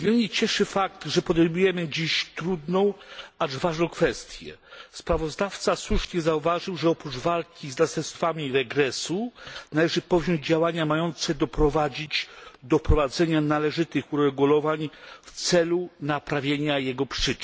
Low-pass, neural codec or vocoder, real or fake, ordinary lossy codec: none; none; real; none